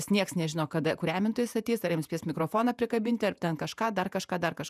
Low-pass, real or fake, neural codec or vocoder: 14.4 kHz; fake; vocoder, 48 kHz, 128 mel bands, Vocos